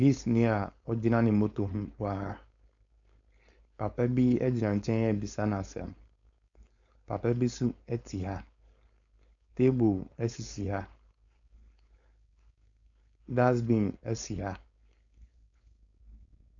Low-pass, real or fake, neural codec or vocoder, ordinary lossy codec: 7.2 kHz; fake; codec, 16 kHz, 4.8 kbps, FACodec; MP3, 96 kbps